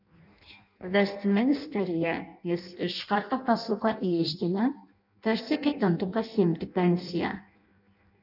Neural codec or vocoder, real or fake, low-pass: codec, 16 kHz in and 24 kHz out, 0.6 kbps, FireRedTTS-2 codec; fake; 5.4 kHz